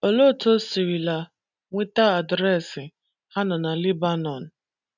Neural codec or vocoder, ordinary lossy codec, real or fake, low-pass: none; none; real; 7.2 kHz